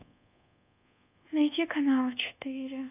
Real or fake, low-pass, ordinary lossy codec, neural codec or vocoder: fake; 3.6 kHz; AAC, 32 kbps; codec, 24 kHz, 1.2 kbps, DualCodec